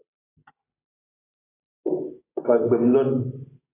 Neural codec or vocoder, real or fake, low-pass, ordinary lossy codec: none; real; 3.6 kHz; AAC, 16 kbps